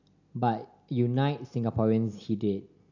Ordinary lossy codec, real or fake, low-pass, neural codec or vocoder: none; real; 7.2 kHz; none